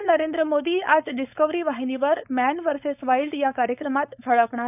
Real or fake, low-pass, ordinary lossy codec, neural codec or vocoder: fake; 3.6 kHz; none; codec, 16 kHz, 4.8 kbps, FACodec